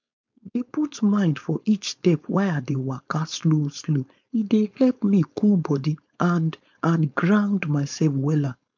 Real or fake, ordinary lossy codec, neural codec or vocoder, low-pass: fake; MP3, 64 kbps; codec, 16 kHz, 4.8 kbps, FACodec; 7.2 kHz